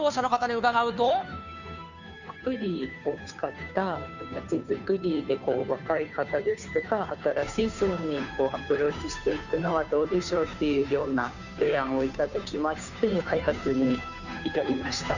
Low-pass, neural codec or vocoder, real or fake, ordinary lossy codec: 7.2 kHz; codec, 16 kHz, 2 kbps, FunCodec, trained on Chinese and English, 25 frames a second; fake; none